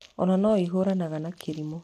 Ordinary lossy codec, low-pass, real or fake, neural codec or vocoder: AAC, 64 kbps; 14.4 kHz; real; none